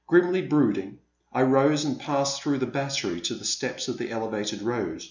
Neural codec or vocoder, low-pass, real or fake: none; 7.2 kHz; real